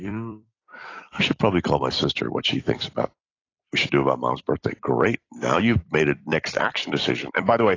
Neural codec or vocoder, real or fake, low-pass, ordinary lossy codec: vocoder, 44.1 kHz, 128 mel bands every 256 samples, BigVGAN v2; fake; 7.2 kHz; AAC, 32 kbps